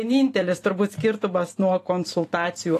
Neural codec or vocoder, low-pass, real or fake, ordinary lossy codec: vocoder, 44.1 kHz, 128 mel bands every 512 samples, BigVGAN v2; 14.4 kHz; fake; AAC, 48 kbps